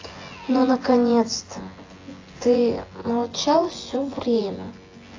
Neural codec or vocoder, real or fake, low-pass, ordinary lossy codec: vocoder, 24 kHz, 100 mel bands, Vocos; fake; 7.2 kHz; AAC, 32 kbps